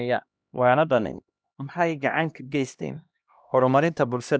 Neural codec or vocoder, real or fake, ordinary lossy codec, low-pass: codec, 16 kHz, 1 kbps, X-Codec, HuBERT features, trained on LibriSpeech; fake; none; none